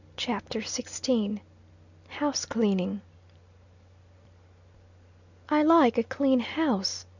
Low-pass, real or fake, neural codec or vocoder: 7.2 kHz; real; none